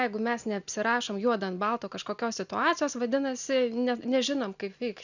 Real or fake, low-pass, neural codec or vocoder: real; 7.2 kHz; none